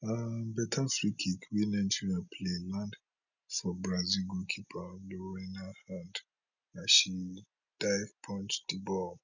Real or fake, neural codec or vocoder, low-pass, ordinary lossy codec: real; none; 7.2 kHz; none